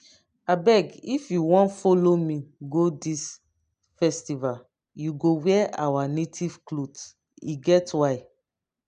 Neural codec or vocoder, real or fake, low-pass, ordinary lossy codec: none; real; 9.9 kHz; none